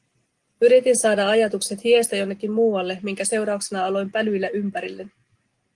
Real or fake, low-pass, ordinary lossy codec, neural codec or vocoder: real; 10.8 kHz; Opus, 24 kbps; none